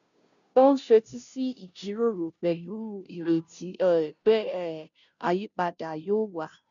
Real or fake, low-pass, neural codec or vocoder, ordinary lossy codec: fake; 7.2 kHz; codec, 16 kHz, 0.5 kbps, FunCodec, trained on Chinese and English, 25 frames a second; none